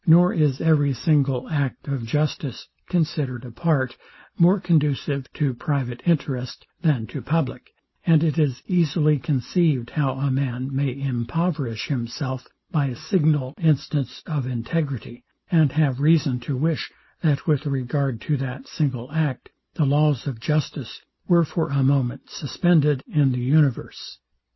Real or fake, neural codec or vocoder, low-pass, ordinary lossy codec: real; none; 7.2 kHz; MP3, 24 kbps